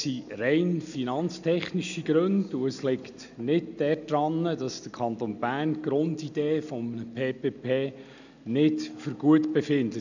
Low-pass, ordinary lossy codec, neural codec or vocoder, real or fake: 7.2 kHz; none; none; real